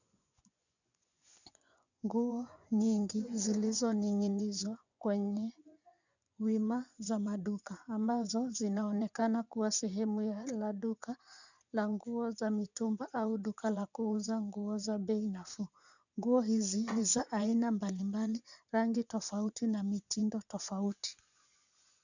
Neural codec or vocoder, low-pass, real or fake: vocoder, 24 kHz, 100 mel bands, Vocos; 7.2 kHz; fake